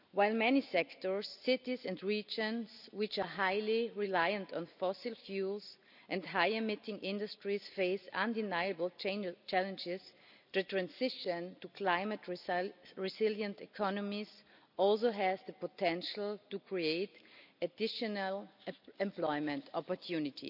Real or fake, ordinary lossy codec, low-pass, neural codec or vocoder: real; none; 5.4 kHz; none